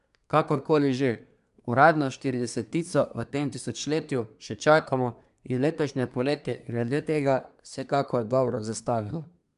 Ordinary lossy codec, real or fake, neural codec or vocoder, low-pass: none; fake; codec, 24 kHz, 1 kbps, SNAC; 10.8 kHz